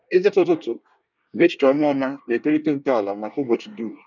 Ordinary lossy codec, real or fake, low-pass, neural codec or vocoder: none; fake; 7.2 kHz; codec, 24 kHz, 1 kbps, SNAC